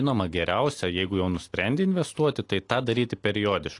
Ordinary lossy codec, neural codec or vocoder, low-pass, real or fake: AAC, 48 kbps; none; 10.8 kHz; real